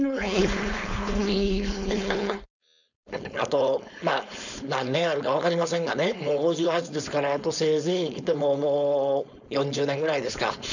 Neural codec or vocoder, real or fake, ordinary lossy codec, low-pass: codec, 16 kHz, 4.8 kbps, FACodec; fake; none; 7.2 kHz